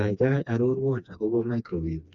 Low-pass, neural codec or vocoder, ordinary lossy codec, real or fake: 7.2 kHz; codec, 16 kHz, 2 kbps, FreqCodec, smaller model; none; fake